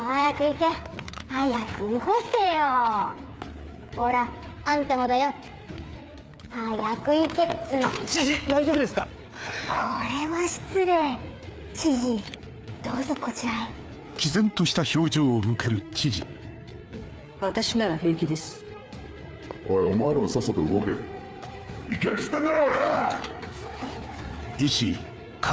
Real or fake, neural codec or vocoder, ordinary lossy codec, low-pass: fake; codec, 16 kHz, 4 kbps, FreqCodec, larger model; none; none